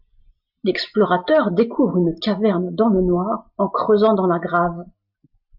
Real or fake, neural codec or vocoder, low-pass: real; none; 5.4 kHz